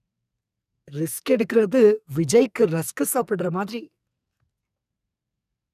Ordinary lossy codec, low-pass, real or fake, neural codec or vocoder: none; 14.4 kHz; fake; codec, 44.1 kHz, 2.6 kbps, SNAC